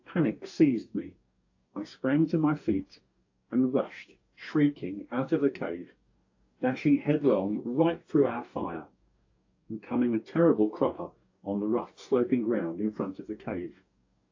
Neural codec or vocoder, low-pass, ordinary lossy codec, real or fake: codec, 44.1 kHz, 2.6 kbps, DAC; 7.2 kHz; AAC, 48 kbps; fake